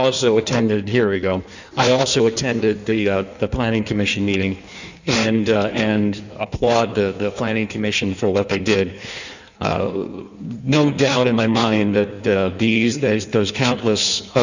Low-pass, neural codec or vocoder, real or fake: 7.2 kHz; codec, 16 kHz in and 24 kHz out, 1.1 kbps, FireRedTTS-2 codec; fake